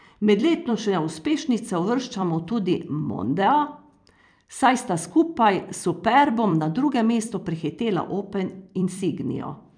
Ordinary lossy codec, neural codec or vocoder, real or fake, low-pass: none; vocoder, 44.1 kHz, 128 mel bands every 256 samples, BigVGAN v2; fake; 9.9 kHz